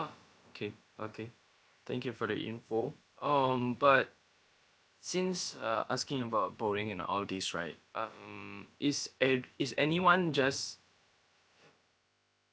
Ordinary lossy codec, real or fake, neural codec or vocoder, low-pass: none; fake; codec, 16 kHz, about 1 kbps, DyCAST, with the encoder's durations; none